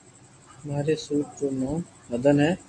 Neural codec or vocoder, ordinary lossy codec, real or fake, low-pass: none; MP3, 48 kbps; real; 10.8 kHz